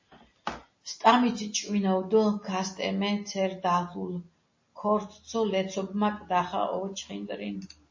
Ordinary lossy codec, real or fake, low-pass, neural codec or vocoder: MP3, 32 kbps; real; 7.2 kHz; none